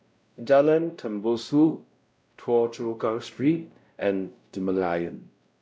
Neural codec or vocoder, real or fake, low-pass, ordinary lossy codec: codec, 16 kHz, 0.5 kbps, X-Codec, WavLM features, trained on Multilingual LibriSpeech; fake; none; none